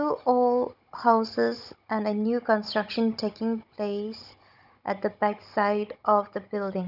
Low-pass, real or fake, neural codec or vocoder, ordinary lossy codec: 5.4 kHz; fake; codec, 16 kHz, 16 kbps, FunCodec, trained on Chinese and English, 50 frames a second; none